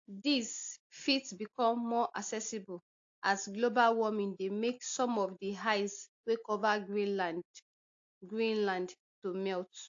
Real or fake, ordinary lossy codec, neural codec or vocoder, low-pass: real; AAC, 48 kbps; none; 7.2 kHz